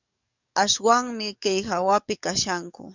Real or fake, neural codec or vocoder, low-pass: fake; codec, 44.1 kHz, 7.8 kbps, DAC; 7.2 kHz